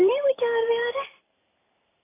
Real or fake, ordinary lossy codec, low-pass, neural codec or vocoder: fake; AAC, 16 kbps; 3.6 kHz; vocoder, 44.1 kHz, 128 mel bands every 512 samples, BigVGAN v2